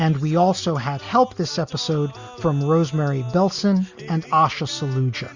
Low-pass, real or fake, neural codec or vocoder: 7.2 kHz; real; none